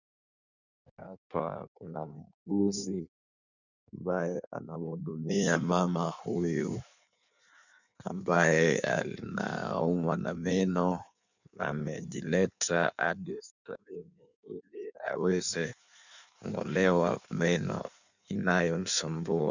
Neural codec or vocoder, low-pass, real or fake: codec, 16 kHz in and 24 kHz out, 1.1 kbps, FireRedTTS-2 codec; 7.2 kHz; fake